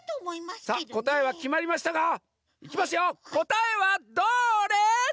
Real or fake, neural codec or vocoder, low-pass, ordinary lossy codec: real; none; none; none